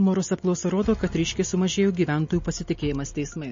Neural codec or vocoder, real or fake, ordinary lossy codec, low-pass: none; real; MP3, 32 kbps; 7.2 kHz